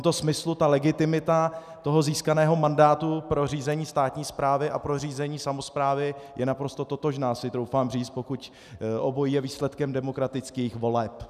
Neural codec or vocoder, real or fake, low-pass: none; real; 14.4 kHz